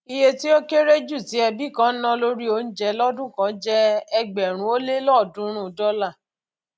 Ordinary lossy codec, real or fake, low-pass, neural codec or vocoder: none; real; none; none